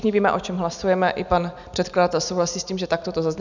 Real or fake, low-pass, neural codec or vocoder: real; 7.2 kHz; none